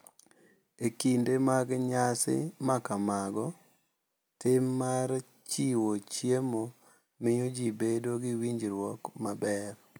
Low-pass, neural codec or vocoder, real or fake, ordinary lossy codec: none; none; real; none